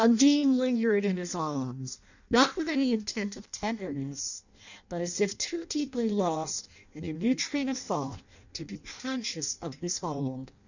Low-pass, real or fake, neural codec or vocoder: 7.2 kHz; fake; codec, 16 kHz in and 24 kHz out, 0.6 kbps, FireRedTTS-2 codec